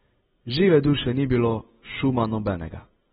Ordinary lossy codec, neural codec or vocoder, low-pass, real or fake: AAC, 16 kbps; none; 19.8 kHz; real